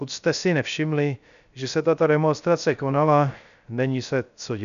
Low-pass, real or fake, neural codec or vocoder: 7.2 kHz; fake; codec, 16 kHz, 0.3 kbps, FocalCodec